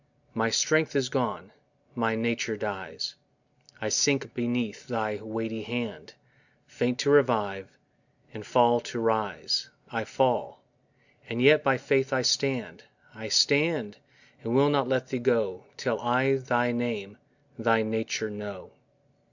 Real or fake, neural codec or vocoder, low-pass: real; none; 7.2 kHz